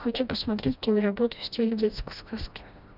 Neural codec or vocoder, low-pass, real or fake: codec, 16 kHz, 1 kbps, FreqCodec, smaller model; 5.4 kHz; fake